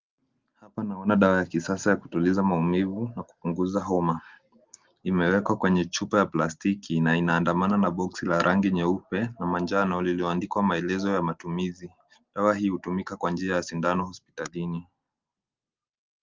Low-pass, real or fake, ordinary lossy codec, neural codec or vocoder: 7.2 kHz; real; Opus, 24 kbps; none